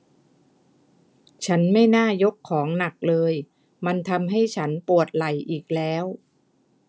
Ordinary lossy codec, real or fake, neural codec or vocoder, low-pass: none; real; none; none